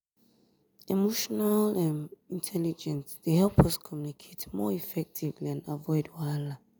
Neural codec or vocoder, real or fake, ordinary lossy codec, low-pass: none; real; none; none